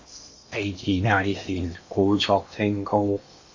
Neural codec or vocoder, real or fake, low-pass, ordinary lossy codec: codec, 16 kHz in and 24 kHz out, 0.8 kbps, FocalCodec, streaming, 65536 codes; fake; 7.2 kHz; MP3, 32 kbps